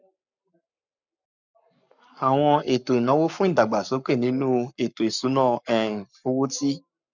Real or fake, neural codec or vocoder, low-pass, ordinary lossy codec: fake; codec, 44.1 kHz, 7.8 kbps, Pupu-Codec; 7.2 kHz; none